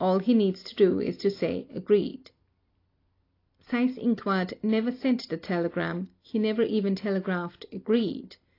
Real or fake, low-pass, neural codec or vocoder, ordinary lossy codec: real; 5.4 kHz; none; AAC, 32 kbps